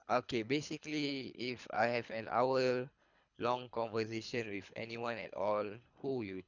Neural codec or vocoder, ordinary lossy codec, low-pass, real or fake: codec, 24 kHz, 3 kbps, HILCodec; none; 7.2 kHz; fake